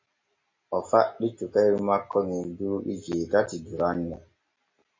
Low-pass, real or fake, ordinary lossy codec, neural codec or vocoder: 7.2 kHz; real; MP3, 32 kbps; none